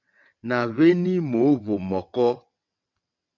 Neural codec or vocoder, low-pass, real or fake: vocoder, 22.05 kHz, 80 mel bands, WaveNeXt; 7.2 kHz; fake